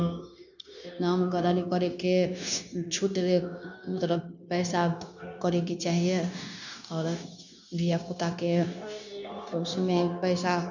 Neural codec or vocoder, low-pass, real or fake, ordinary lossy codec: codec, 16 kHz, 0.9 kbps, LongCat-Audio-Codec; 7.2 kHz; fake; none